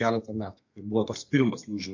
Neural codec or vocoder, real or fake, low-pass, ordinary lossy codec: codec, 32 kHz, 1.9 kbps, SNAC; fake; 7.2 kHz; MP3, 48 kbps